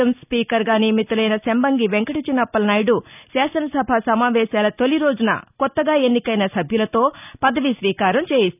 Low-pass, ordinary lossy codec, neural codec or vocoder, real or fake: 3.6 kHz; none; none; real